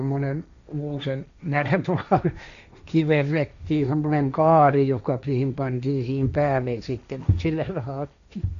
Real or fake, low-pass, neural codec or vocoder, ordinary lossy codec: fake; 7.2 kHz; codec, 16 kHz, 1.1 kbps, Voila-Tokenizer; none